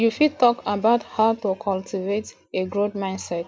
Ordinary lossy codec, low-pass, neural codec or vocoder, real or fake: none; none; none; real